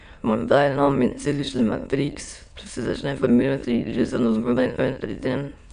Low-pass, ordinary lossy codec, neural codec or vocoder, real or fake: 9.9 kHz; none; autoencoder, 22.05 kHz, a latent of 192 numbers a frame, VITS, trained on many speakers; fake